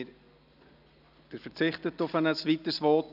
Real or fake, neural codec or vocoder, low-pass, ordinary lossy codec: real; none; 5.4 kHz; none